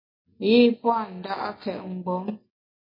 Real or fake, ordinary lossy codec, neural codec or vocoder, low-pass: real; MP3, 24 kbps; none; 5.4 kHz